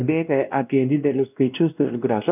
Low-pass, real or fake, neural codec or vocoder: 3.6 kHz; fake; codec, 16 kHz, 1 kbps, X-Codec, WavLM features, trained on Multilingual LibriSpeech